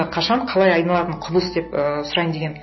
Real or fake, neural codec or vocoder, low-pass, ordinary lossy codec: real; none; 7.2 kHz; MP3, 24 kbps